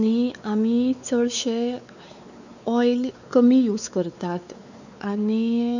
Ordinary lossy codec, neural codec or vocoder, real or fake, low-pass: none; codec, 16 kHz, 4 kbps, X-Codec, WavLM features, trained on Multilingual LibriSpeech; fake; 7.2 kHz